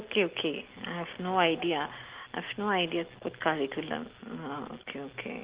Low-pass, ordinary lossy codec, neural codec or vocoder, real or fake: 3.6 kHz; Opus, 16 kbps; none; real